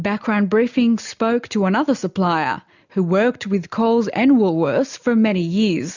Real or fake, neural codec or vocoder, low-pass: real; none; 7.2 kHz